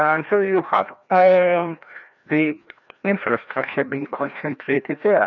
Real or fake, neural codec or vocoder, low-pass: fake; codec, 16 kHz, 1 kbps, FreqCodec, larger model; 7.2 kHz